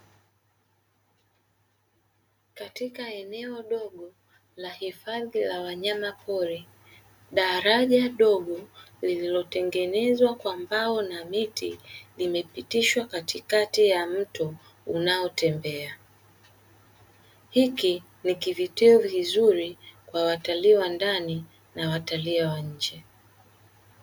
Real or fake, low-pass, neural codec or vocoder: real; 19.8 kHz; none